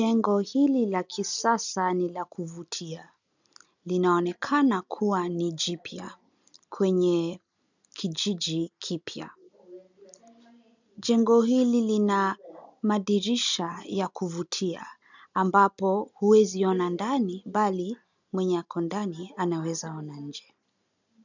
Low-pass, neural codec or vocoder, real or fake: 7.2 kHz; none; real